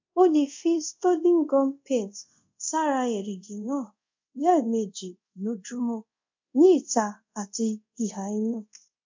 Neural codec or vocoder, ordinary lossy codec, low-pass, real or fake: codec, 24 kHz, 0.5 kbps, DualCodec; MP3, 64 kbps; 7.2 kHz; fake